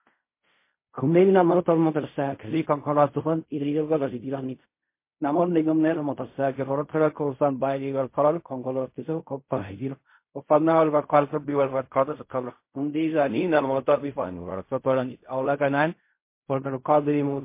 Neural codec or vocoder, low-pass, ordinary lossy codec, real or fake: codec, 16 kHz in and 24 kHz out, 0.4 kbps, LongCat-Audio-Codec, fine tuned four codebook decoder; 3.6 kHz; MP3, 24 kbps; fake